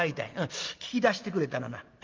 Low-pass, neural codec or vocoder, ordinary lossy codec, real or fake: 7.2 kHz; none; Opus, 24 kbps; real